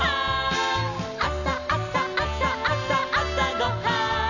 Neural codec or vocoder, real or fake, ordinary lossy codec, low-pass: none; real; none; 7.2 kHz